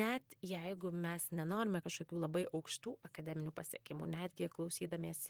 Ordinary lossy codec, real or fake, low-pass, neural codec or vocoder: Opus, 24 kbps; fake; 19.8 kHz; vocoder, 44.1 kHz, 128 mel bands, Pupu-Vocoder